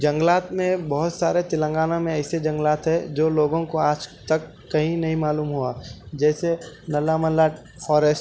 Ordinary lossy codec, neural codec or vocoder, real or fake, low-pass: none; none; real; none